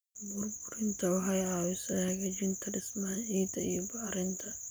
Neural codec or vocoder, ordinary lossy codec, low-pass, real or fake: none; none; none; real